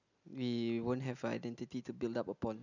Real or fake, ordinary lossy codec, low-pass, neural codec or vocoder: real; none; 7.2 kHz; none